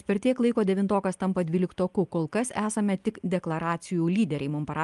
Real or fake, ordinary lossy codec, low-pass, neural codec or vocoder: real; Opus, 32 kbps; 10.8 kHz; none